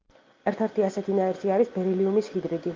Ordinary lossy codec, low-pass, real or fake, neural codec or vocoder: Opus, 32 kbps; 7.2 kHz; real; none